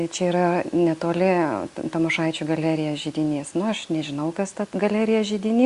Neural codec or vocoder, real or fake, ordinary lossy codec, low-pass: none; real; Opus, 64 kbps; 10.8 kHz